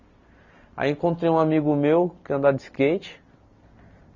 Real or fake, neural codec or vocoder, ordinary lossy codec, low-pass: real; none; none; 7.2 kHz